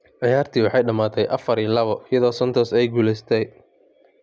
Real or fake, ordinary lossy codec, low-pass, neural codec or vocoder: real; none; none; none